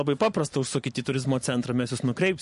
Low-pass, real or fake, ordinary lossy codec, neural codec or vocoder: 14.4 kHz; fake; MP3, 48 kbps; vocoder, 44.1 kHz, 128 mel bands every 256 samples, BigVGAN v2